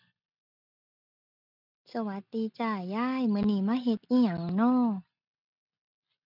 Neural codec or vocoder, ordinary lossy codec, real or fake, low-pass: none; none; real; 5.4 kHz